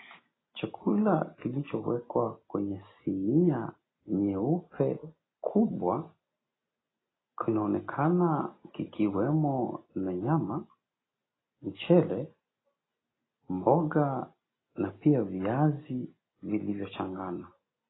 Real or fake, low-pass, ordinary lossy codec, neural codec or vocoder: real; 7.2 kHz; AAC, 16 kbps; none